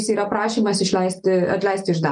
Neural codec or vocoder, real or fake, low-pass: none; real; 9.9 kHz